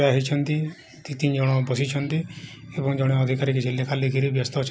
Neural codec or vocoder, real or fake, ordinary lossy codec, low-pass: none; real; none; none